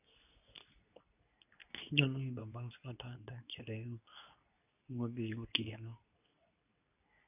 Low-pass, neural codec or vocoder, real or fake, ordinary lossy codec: 3.6 kHz; codec, 24 kHz, 0.9 kbps, WavTokenizer, medium speech release version 2; fake; none